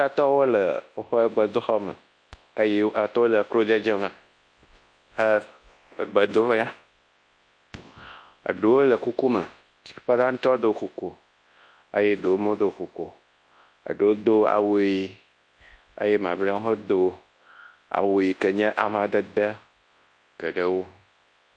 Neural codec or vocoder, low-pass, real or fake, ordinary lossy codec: codec, 24 kHz, 0.9 kbps, WavTokenizer, large speech release; 9.9 kHz; fake; MP3, 48 kbps